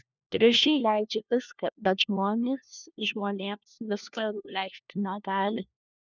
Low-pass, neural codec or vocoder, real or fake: 7.2 kHz; codec, 16 kHz, 1 kbps, FunCodec, trained on LibriTTS, 50 frames a second; fake